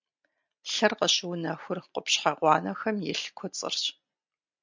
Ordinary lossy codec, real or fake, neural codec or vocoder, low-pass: MP3, 64 kbps; real; none; 7.2 kHz